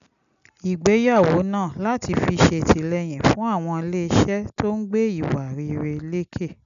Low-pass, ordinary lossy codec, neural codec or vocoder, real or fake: 7.2 kHz; none; none; real